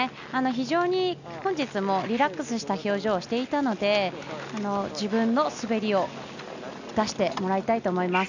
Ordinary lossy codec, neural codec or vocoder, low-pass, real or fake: none; none; 7.2 kHz; real